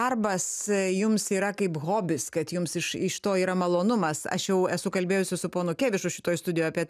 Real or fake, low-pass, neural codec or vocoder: real; 14.4 kHz; none